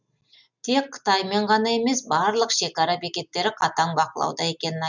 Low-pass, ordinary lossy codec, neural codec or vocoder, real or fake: 7.2 kHz; none; none; real